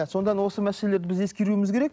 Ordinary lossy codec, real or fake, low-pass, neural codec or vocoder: none; real; none; none